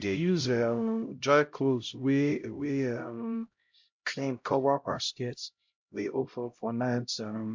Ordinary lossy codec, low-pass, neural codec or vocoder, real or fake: MP3, 48 kbps; 7.2 kHz; codec, 16 kHz, 0.5 kbps, X-Codec, HuBERT features, trained on LibriSpeech; fake